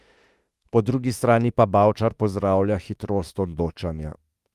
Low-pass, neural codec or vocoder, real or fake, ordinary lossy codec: 14.4 kHz; autoencoder, 48 kHz, 32 numbers a frame, DAC-VAE, trained on Japanese speech; fake; Opus, 32 kbps